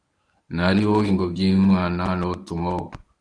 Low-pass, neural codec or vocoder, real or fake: 9.9 kHz; codec, 24 kHz, 0.9 kbps, WavTokenizer, medium speech release version 1; fake